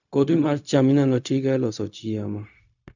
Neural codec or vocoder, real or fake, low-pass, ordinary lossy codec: codec, 16 kHz, 0.4 kbps, LongCat-Audio-Codec; fake; 7.2 kHz; none